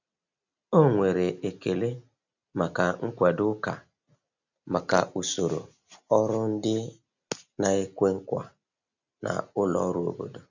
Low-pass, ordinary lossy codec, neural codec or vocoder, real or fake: 7.2 kHz; none; none; real